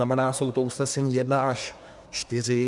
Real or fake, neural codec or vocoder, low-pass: fake; codec, 24 kHz, 1 kbps, SNAC; 10.8 kHz